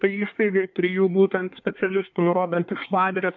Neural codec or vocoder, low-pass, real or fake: codec, 24 kHz, 1 kbps, SNAC; 7.2 kHz; fake